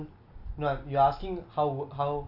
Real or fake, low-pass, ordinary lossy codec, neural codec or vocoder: real; 5.4 kHz; none; none